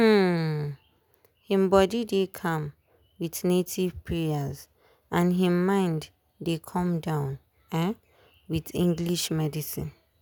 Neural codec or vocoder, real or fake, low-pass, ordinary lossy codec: none; real; none; none